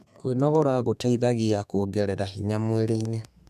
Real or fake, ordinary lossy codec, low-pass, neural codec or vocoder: fake; none; 14.4 kHz; codec, 32 kHz, 1.9 kbps, SNAC